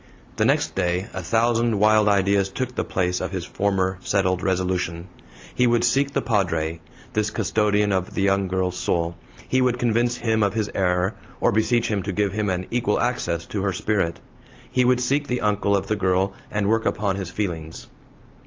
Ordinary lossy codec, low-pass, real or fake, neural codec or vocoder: Opus, 32 kbps; 7.2 kHz; real; none